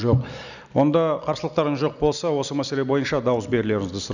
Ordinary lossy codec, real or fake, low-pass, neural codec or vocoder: none; real; 7.2 kHz; none